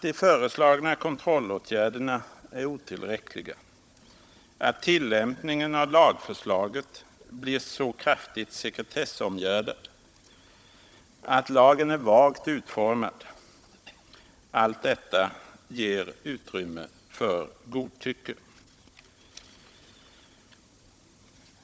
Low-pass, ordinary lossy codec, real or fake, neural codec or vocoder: none; none; fake; codec, 16 kHz, 16 kbps, FunCodec, trained on Chinese and English, 50 frames a second